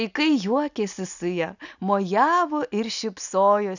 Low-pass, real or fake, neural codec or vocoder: 7.2 kHz; real; none